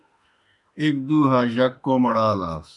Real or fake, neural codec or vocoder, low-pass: fake; autoencoder, 48 kHz, 32 numbers a frame, DAC-VAE, trained on Japanese speech; 10.8 kHz